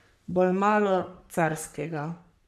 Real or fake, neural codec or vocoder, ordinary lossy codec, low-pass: fake; codec, 44.1 kHz, 3.4 kbps, Pupu-Codec; none; 14.4 kHz